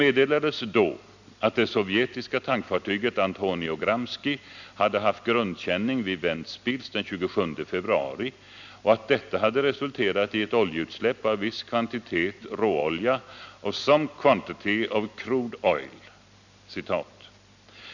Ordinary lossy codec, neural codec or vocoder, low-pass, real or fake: none; none; 7.2 kHz; real